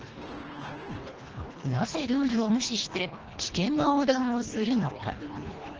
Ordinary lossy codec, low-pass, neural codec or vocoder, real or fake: Opus, 16 kbps; 7.2 kHz; codec, 24 kHz, 1.5 kbps, HILCodec; fake